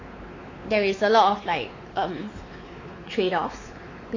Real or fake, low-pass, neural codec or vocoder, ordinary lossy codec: fake; 7.2 kHz; codec, 16 kHz, 4 kbps, X-Codec, WavLM features, trained on Multilingual LibriSpeech; AAC, 32 kbps